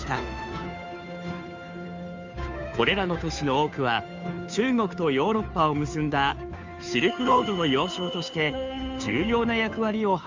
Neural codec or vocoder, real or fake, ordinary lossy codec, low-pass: codec, 16 kHz, 2 kbps, FunCodec, trained on Chinese and English, 25 frames a second; fake; MP3, 64 kbps; 7.2 kHz